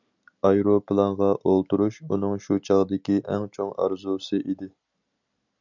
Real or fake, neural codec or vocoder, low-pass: real; none; 7.2 kHz